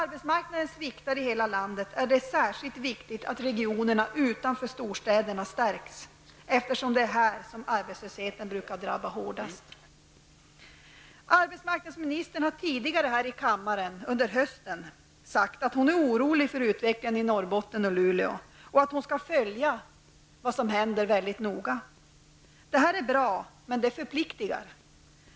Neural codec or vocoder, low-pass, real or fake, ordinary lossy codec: none; none; real; none